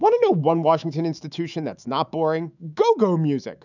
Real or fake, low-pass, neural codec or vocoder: fake; 7.2 kHz; autoencoder, 48 kHz, 128 numbers a frame, DAC-VAE, trained on Japanese speech